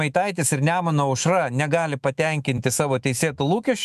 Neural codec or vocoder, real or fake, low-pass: autoencoder, 48 kHz, 128 numbers a frame, DAC-VAE, trained on Japanese speech; fake; 14.4 kHz